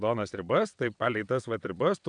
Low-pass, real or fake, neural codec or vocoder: 9.9 kHz; fake; vocoder, 22.05 kHz, 80 mel bands, WaveNeXt